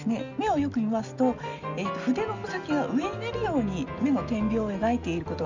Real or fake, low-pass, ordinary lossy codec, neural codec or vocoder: real; 7.2 kHz; Opus, 64 kbps; none